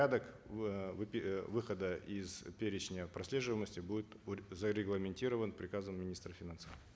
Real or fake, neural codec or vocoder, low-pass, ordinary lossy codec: real; none; none; none